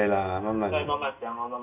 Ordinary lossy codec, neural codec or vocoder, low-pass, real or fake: none; none; 3.6 kHz; real